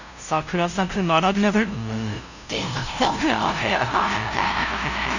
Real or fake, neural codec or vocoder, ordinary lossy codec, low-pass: fake; codec, 16 kHz, 0.5 kbps, FunCodec, trained on LibriTTS, 25 frames a second; none; 7.2 kHz